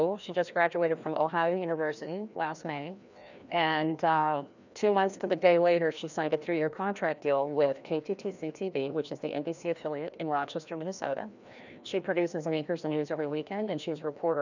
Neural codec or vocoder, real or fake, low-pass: codec, 16 kHz, 1 kbps, FreqCodec, larger model; fake; 7.2 kHz